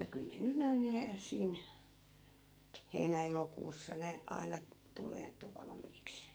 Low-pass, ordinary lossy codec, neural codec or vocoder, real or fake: none; none; codec, 44.1 kHz, 2.6 kbps, SNAC; fake